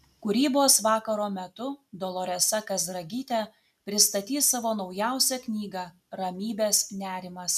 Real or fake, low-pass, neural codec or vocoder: real; 14.4 kHz; none